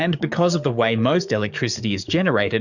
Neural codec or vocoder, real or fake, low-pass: codec, 44.1 kHz, 7.8 kbps, DAC; fake; 7.2 kHz